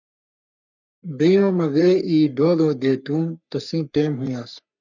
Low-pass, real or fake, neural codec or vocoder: 7.2 kHz; fake; codec, 44.1 kHz, 3.4 kbps, Pupu-Codec